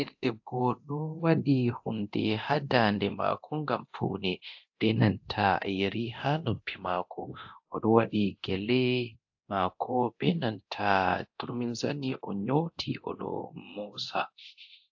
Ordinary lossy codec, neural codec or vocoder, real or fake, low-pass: AAC, 48 kbps; codec, 24 kHz, 0.9 kbps, DualCodec; fake; 7.2 kHz